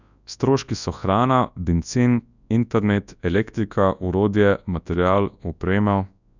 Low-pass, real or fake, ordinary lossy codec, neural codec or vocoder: 7.2 kHz; fake; none; codec, 24 kHz, 0.9 kbps, WavTokenizer, large speech release